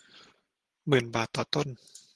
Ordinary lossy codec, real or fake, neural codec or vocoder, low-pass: Opus, 16 kbps; real; none; 10.8 kHz